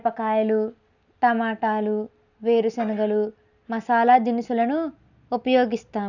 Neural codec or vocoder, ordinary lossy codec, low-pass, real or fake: none; none; 7.2 kHz; real